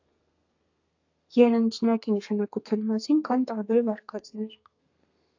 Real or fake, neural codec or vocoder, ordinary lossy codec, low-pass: fake; codec, 32 kHz, 1.9 kbps, SNAC; AAC, 48 kbps; 7.2 kHz